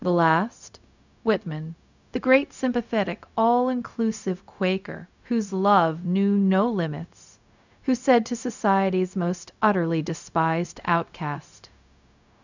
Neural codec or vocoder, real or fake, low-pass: codec, 16 kHz, 0.4 kbps, LongCat-Audio-Codec; fake; 7.2 kHz